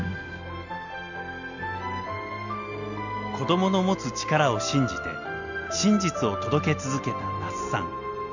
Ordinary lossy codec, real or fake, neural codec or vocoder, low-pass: none; real; none; 7.2 kHz